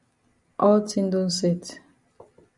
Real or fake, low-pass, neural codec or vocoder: real; 10.8 kHz; none